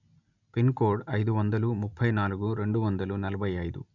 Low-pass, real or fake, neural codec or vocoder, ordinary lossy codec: 7.2 kHz; real; none; none